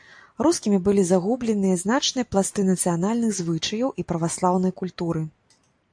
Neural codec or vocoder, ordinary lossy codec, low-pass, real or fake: none; AAC, 64 kbps; 9.9 kHz; real